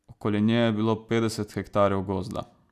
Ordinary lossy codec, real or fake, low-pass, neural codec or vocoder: none; real; 14.4 kHz; none